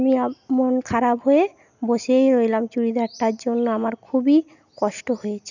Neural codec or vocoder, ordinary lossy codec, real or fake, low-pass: none; none; real; 7.2 kHz